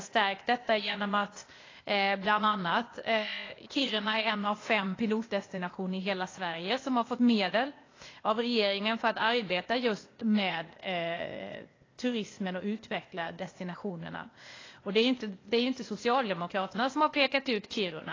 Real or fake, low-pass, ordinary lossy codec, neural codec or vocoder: fake; 7.2 kHz; AAC, 32 kbps; codec, 16 kHz, 0.8 kbps, ZipCodec